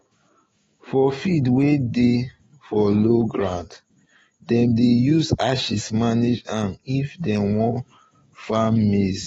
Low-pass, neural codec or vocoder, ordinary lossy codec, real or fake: 19.8 kHz; autoencoder, 48 kHz, 128 numbers a frame, DAC-VAE, trained on Japanese speech; AAC, 24 kbps; fake